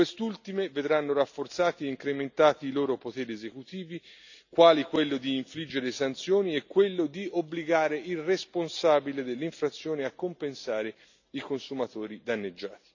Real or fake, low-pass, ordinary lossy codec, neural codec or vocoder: real; 7.2 kHz; none; none